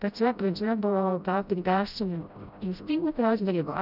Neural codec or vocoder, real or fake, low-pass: codec, 16 kHz, 0.5 kbps, FreqCodec, smaller model; fake; 5.4 kHz